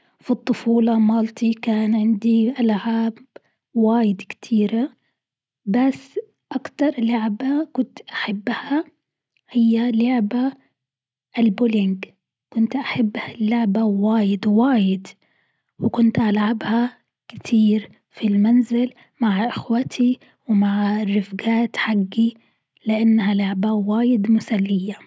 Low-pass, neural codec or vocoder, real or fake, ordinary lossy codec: none; none; real; none